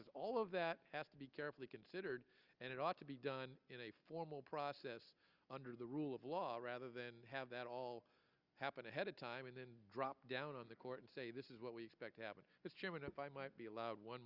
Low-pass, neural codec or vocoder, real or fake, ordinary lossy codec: 5.4 kHz; none; real; Opus, 32 kbps